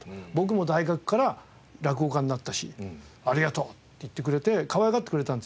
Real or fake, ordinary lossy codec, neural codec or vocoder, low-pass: real; none; none; none